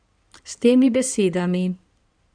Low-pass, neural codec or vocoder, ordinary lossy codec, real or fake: 9.9 kHz; codec, 16 kHz in and 24 kHz out, 2.2 kbps, FireRedTTS-2 codec; none; fake